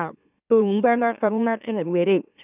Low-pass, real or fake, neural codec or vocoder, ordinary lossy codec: 3.6 kHz; fake; autoencoder, 44.1 kHz, a latent of 192 numbers a frame, MeloTTS; none